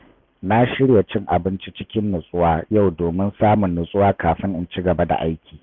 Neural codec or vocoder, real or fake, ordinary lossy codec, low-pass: none; real; none; 7.2 kHz